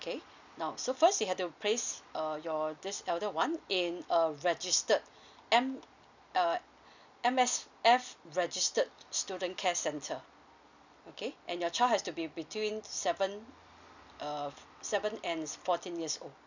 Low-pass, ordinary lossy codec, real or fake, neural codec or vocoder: 7.2 kHz; none; real; none